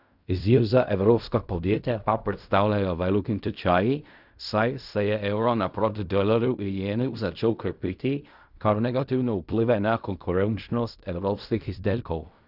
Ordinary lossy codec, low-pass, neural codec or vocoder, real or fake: none; 5.4 kHz; codec, 16 kHz in and 24 kHz out, 0.4 kbps, LongCat-Audio-Codec, fine tuned four codebook decoder; fake